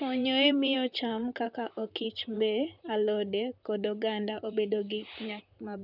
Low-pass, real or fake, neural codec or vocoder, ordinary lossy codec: 5.4 kHz; fake; vocoder, 44.1 kHz, 128 mel bands, Pupu-Vocoder; none